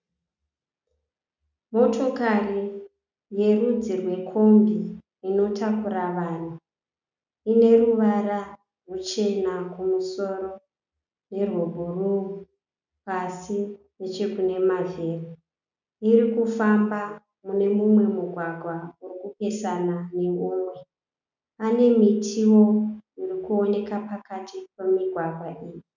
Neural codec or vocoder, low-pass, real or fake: none; 7.2 kHz; real